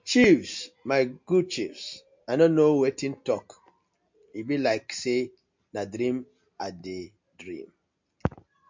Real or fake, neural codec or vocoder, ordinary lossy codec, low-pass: real; none; MP3, 48 kbps; 7.2 kHz